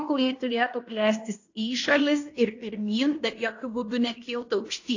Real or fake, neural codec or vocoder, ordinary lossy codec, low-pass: fake; codec, 16 kHz in and 24 kHz out, 0.9 kbps, LongCat-Audio-Codec, fine tuned four codebook decoder; AAC, 48 kbps; 7.2 kHz